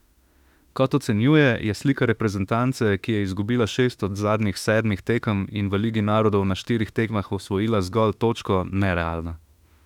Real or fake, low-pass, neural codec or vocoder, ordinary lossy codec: fake; 19.8 kHz; autoencoder, 48 kHz, 32 numbers a frame, DAC-VAE, trained on Japanese speech; none